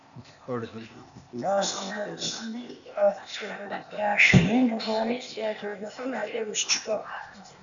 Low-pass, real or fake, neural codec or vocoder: 7.2 kHz; fake; codec, 16 kHz, 0.8 kbps, ZipCodec